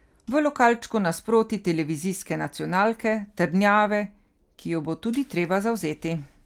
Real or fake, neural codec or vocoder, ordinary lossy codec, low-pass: real; none; Opus, 24 kbps; 19.8 kHz